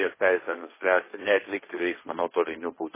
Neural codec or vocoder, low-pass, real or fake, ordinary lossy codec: codec, 16 kHz, 1.1 kbps, Voila-Tokenizer; 3.6 kHz; fake; MP3, 16 kbps